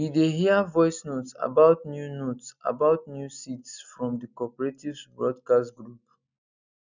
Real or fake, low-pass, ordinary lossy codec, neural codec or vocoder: real; 7.2 kHz; none; none